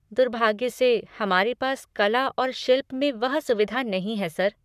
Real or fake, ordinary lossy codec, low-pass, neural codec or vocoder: fake; none; 14.4 kHz; codec, 44.1 kHz, 7.8 kbps, Pupu-Codec